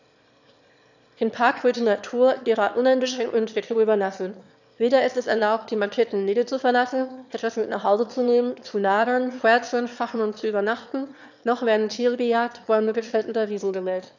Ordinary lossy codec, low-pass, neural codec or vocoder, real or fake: none; 7.2 kHz; autoencoder, 22.05 kHz, a latent of 192 numbers a frame, VITS, trained on one speaker; fake